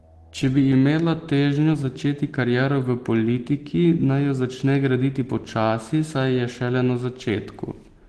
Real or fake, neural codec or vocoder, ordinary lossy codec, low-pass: real; none; Opus, 16 kbps; 10.8 kHz